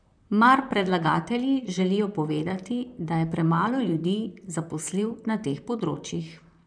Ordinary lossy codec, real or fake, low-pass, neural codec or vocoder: none; fake; 9.9 kHz; vocoder, 44.1 kHz, 128 mel bands, Pupu-Vocoder